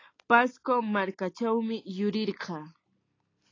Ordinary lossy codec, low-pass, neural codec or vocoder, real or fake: AAC, 32 kbps; 7.2 kHz; none; real